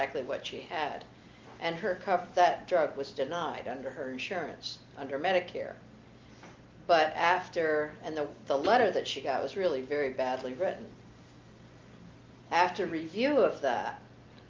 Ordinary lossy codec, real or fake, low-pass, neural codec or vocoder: Opus, 32 kbps; real; 7.2 kHz; none